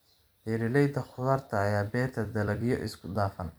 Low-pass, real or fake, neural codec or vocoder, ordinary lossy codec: none; fake; vocoder, 44.1 kHz, 128 mel bands every 256 samples, BigVGAN v2; none